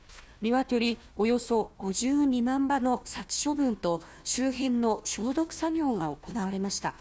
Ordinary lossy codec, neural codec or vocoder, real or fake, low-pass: none; codec, 16 kHz, 1 kbps, FunCodec, trained on Chinese and English, 50 frames a second; fake; none